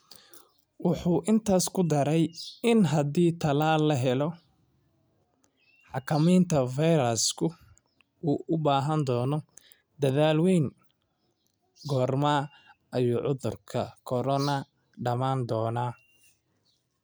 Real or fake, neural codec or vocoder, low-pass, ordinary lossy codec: real; none; none; none